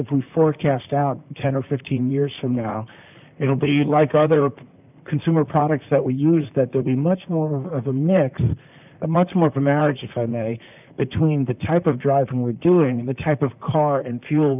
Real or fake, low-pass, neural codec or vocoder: fake; 3.6 kHz; vocoder, 44.1 kHz, 128 mel bands, Pupu-Vocoder